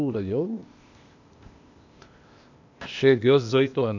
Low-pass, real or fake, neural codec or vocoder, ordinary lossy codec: 7.2 kHz; fake; codec, 16 kHz, 0.8 kbps, ZipCodec; none